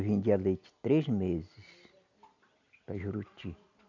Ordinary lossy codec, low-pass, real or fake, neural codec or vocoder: none; 7.2 kHz; real; none